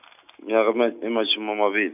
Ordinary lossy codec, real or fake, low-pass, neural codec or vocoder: none; real; 3.6 kHz; none